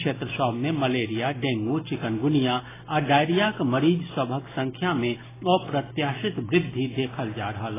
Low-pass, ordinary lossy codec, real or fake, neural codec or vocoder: 3.6 kHz; AAC, 16 kbps; real; none